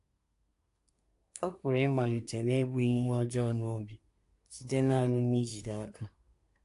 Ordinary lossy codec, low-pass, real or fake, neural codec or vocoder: AAC, 64 kbps; 10.8 kHz; fake; codec, 24 kHz, 1 kbps, SNAC